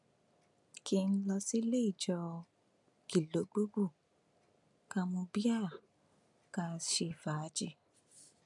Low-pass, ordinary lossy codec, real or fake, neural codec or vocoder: 10.8 kHz; none; real; none